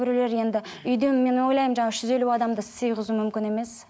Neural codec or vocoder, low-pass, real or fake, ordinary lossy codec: none; none; real; none